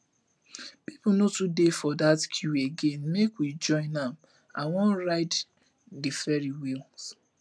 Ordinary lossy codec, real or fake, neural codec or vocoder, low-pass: none; real; none; none